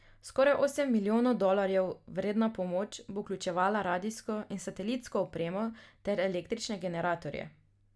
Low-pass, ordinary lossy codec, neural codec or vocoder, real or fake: none; none; none; real